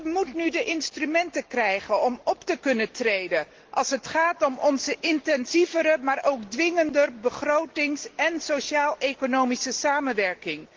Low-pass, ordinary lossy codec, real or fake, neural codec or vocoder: 7.2 kHz; Opus, 16 kbps; real; none